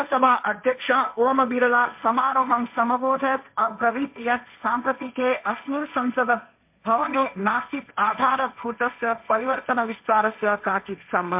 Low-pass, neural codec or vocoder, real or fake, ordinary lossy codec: 3.6 kHz; codec, 16 kHz, 1.1 kbps, Voila-Tokenizer; fake; MP3, 32 kbps